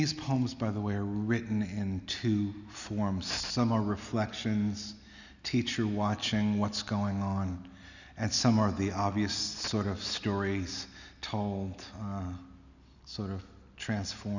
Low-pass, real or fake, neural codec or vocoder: 7.2 kHz; real; none